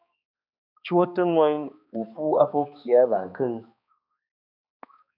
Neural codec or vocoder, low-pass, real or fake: codec, 16 kHz, 2 kbps, X-Codec, HuBERT features, trained on balanced general audio; 5.4 kHz; fake